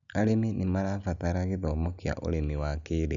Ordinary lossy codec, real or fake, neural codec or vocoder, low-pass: none; real; none; 7.2 kHz